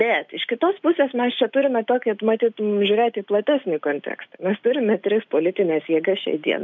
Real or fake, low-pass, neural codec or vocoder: real; 7.2 kHz; none